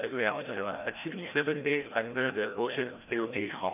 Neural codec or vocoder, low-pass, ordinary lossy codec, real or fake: codec, 16 kHz, 1 kbps, FreqCodec, larger model; 3.6 kHz; none; fake